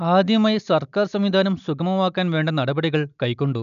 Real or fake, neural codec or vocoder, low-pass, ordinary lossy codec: real; none; 7.2 kHz; none